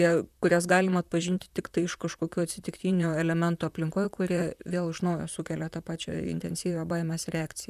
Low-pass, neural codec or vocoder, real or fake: 14.4 kHz; vocoder, 44.1 kHz, 128 mel bands, Pupu-Vocoder; fake